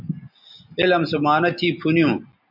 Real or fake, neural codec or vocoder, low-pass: real; none; 5.4 kHz